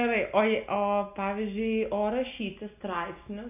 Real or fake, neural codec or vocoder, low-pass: real; none; 3.6 kHz